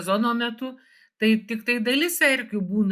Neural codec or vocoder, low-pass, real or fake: none; 14.4 kHz; real